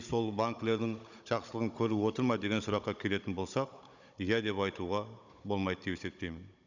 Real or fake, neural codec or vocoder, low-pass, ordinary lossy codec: fake; codec, 16 kHz, 16 kbps, FunCodec, trained on Chinese and English, 50 frames a second; 7.2 kHz; none